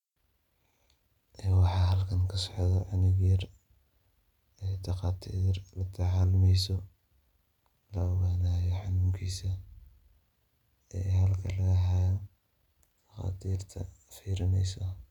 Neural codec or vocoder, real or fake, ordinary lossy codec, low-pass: none; real; none; 19.8 kHz